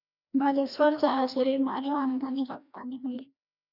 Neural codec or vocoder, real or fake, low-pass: codec, 16 kHz, 1 kbps, FreqCodec, larger model; fake; 5.4 kHz